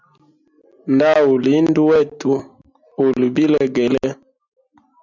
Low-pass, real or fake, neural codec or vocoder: 7.2 kHz; real; none